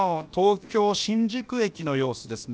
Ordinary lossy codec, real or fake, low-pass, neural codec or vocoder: none; fake; none; codec, 16 kHz, about 1 kbps, DyCAST, with the encoder's durations